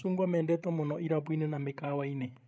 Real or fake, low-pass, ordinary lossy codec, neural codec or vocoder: fake; none; none; codec, 16 kHz, 16 kbps, FreqCodec, larger model